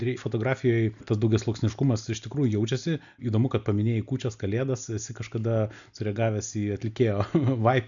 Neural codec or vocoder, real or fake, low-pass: none; real; 7.2 kHz